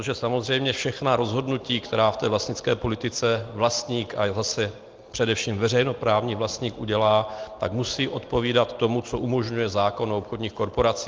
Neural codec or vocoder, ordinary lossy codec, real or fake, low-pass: none; Opus, 32 kbps; real; 7.2 kHz